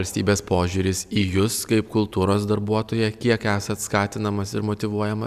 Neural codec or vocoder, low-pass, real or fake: none; 14.4 kHz; real